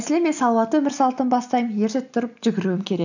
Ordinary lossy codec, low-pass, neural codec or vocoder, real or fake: none; 7.2 kHz; none; real